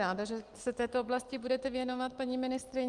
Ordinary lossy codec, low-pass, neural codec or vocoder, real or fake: Opus, 24 kbps; 10.8 kHz; none; real